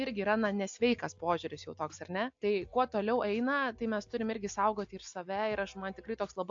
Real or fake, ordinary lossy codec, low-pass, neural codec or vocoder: real; AAC, 64 kbps; 7.2 kHz; none